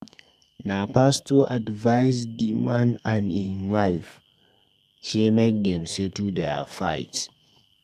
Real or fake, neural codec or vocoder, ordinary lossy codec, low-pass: fake; codec, 32 kHz, 1.9 kbps, SNAC; none; 14.4 kHz